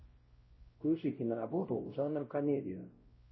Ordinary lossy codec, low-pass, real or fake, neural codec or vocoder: MP3, 24 kbps; 7.2 kHz; fake; codec, 16 kHz, 0.5 kbps, X-Codec, WavLM features, trained on Multilingual LibriSpeech